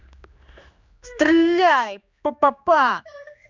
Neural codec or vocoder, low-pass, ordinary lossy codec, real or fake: codec, 16 kHz, 2 kbps, X-Codec, HuBERT features, trained on general audio; 7.2 kHz; Opus, 64 kbps; fake